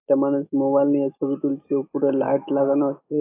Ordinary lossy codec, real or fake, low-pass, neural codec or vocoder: AAC, 24 kbps; fake; 3.6 kHz; autoencoder, 48 kHz, 128 numbers a frame, DAC-VAE, trained on Japanese speech